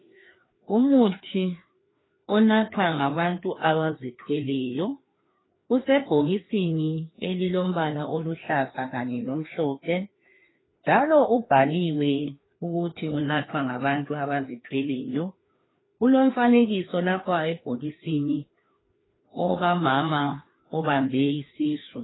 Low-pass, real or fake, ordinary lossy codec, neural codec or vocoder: 7.2 kHz; fake; AAC, 16 kbps; codec, 16 kHz, 2 kbps, FreqCodec, larger model